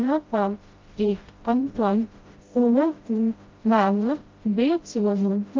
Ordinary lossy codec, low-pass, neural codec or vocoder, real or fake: Opus, 32 kbps; 7.2 kHz; codec, 16 kHz, 0.5 kbps, FreqCodec, smaller model; fake